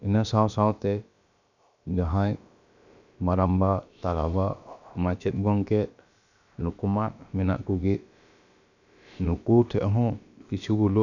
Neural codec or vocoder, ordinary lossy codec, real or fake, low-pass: codec, 16 kHz, about 1 kbps, DyCAST, with the encoder's durations; none; fake; 7.2 kHz